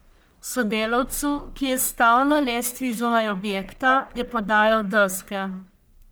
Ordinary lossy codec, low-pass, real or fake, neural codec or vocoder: none; none; fake; codec, 44.1 kHz, 1.7 kbps, Pupu-Codec